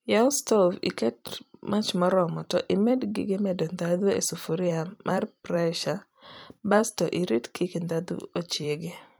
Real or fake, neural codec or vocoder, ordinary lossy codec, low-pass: fake; vocoder, 44.1 kHz, 128 mel bands every 256 samples, BigVGAN v2; none; none